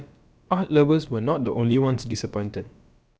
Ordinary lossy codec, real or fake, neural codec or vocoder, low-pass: none; fake; codec, 16 kHz, about 1 kbps, DyCAST, with the encoder's durations; none